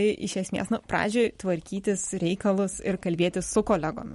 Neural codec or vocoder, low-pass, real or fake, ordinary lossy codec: none; 19.8 kHz; real; MP3, 48 kbps